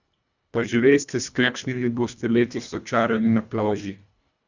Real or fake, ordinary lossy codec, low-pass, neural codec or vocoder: fake; none; 7.2 kHz; codec, 24 kHz, 1.5 kbps, HILCodec